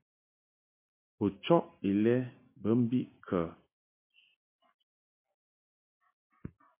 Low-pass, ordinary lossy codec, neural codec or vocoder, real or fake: 3.6 kHz; MP3, 24 kbps; none; real